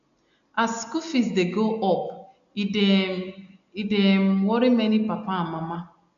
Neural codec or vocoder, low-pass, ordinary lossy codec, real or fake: none; 7.2 kHz; none; real